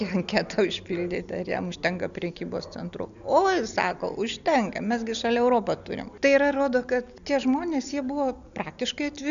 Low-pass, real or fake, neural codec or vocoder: 7.2 kHz; real; none